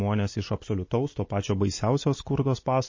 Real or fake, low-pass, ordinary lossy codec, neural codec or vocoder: real; 7.2 kHz; MP3, 32 kbps; none